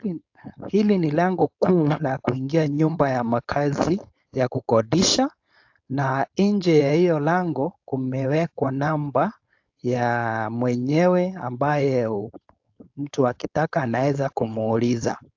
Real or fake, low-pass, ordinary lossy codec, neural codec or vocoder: fake; 7.2 kHz; AAC, 48 kbps; codec, 16 kHz, 4.8 kbps, FACodec